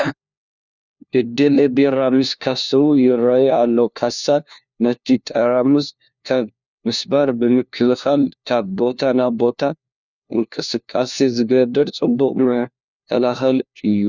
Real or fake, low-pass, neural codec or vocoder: fake; 7.2 kHz; codec, 16 kHz, 1 kbps, FunCodec, trained on LibriTTS, 50 frames a second